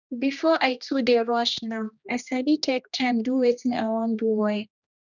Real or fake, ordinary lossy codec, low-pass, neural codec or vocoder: fake; none; 7.2 kHz; codec, 16 kHz, 1 kbps, X-Codec, HuBERT features, trained on general audio